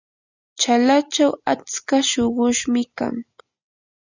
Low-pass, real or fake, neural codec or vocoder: 7.2 kHz; real; none